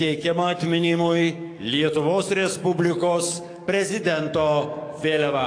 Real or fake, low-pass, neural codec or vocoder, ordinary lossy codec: fake; 9.9 kHz; codec, 44.1 kHz, 7.8 kbps, DAC; AAC, 48 kbps